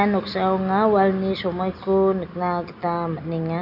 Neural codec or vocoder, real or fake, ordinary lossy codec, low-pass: none; real; none; 5.4 kHz